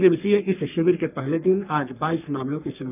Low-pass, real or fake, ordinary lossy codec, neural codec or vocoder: 3.6 kHz; fake; none; codec, 44.1 kHz, 3.4 kbps, Pupu-Codec